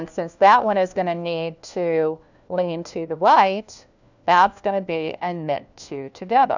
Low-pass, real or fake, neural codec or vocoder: 7.2 kHz; fake; codec, 16 kHz, 1 kbps, FunCodec, trained on LibriTTS, 50 frames a second